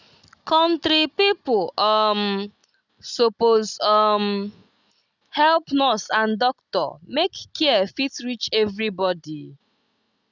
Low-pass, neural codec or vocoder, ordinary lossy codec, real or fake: 7.2 kHz; none; none; real